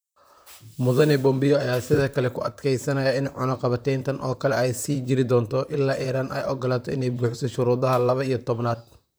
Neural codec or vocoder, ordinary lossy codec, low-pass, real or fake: vocoder, 44.1 kHz, 128 mel bands, Pupu-Vocoder; none; none; fake